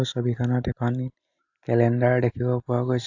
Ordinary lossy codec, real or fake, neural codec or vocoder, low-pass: AAC, 48 kbps; real; none; 7.2 kHz